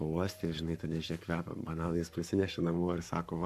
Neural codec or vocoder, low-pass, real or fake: codec, 44.1 kHz, 7.8 kbps, Pupu-Codec; 14.4 kHz; fake